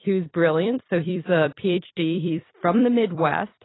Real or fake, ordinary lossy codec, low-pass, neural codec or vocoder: fake; AAC, 16 kbps; 7.2 kHz; vocoder, 44.1 kHz, 128 mel bands every 256 samples, BigVGAN v2